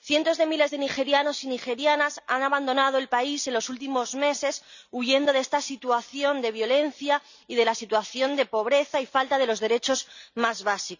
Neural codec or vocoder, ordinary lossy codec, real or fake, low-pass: none; none; real; 7.2 kHz